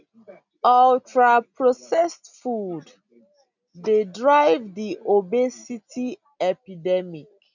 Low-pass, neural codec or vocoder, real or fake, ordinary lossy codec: 7.2 kHz; none; real; none